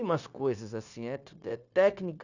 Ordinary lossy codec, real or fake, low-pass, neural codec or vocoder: none; fake; 7.2 kHz; codec, 16 kHz, 0.9 kbps, LongCat-Audio-Codec